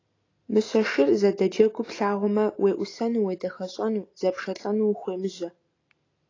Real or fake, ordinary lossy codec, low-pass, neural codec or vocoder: real; AAC, 32 kbps; 7.2 kHz; none